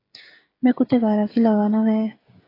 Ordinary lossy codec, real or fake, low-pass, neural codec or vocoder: AAC, 24 kbps; fake; 5.4 kHz; codec, 16 kHz, 16 kbps, FreqCodec, smaller model